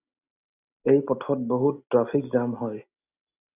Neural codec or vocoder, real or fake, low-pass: none; real; 3.6 kHz